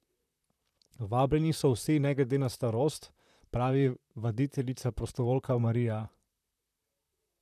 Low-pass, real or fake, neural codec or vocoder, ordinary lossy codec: 14.4 kHz; fake; vocoder, 44.1 kHz, 128 mel bands, Pupu-Vocoder; none